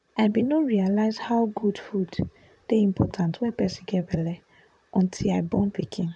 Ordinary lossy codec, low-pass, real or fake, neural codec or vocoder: none; 10.8 kHz; fake; vocoder, 44.1 kHz, 128 mel bands, Pupu-Vocoder